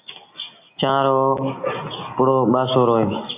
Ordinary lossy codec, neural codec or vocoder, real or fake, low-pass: AAC, 24 kbps; none; real; 3.6 kHz